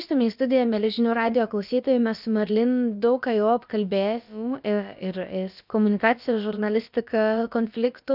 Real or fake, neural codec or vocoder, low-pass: fake; codec, 16 kHz, about 1 kbps, DyCAST, with the encoder's durations; 5.4 kHz